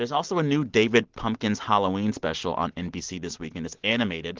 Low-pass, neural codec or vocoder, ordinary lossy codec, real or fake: 7.2 kHz; none; Opus, 16 kbps; real